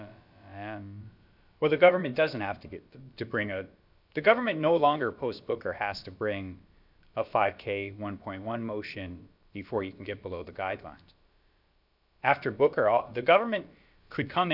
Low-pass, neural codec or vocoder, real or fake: 5.4 kHz; codec, 16 kHz, about 1 kbps, DyCAST, with the encoder's durations; fake